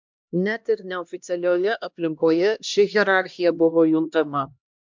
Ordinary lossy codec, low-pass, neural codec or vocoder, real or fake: MP3, 64 kbps; 7.2 kHz; codec, 16 kHz, 1 kbps, X-Codec, HuBERT features, trained on LibriSpeech; fake